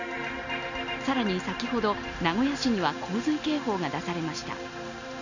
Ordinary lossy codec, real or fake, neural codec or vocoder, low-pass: none; real; none; 7.2 kHz